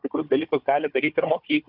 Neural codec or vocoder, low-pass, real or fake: codec, 16 kHz, 4 kbps, FunCodec, trained on Chinese and English, 50 frames a second; 5.4 kHz; fake